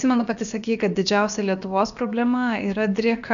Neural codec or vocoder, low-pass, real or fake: codec, 16 kHz, about 1 kbps, DyCAST, with the encoder's durations; 7.2 kHz; fake